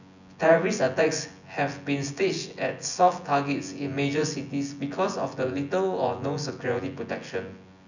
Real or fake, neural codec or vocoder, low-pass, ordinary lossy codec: fake; vocoder, 24 kHz, 100 mel bands, Vocos; 7.2 kHz; none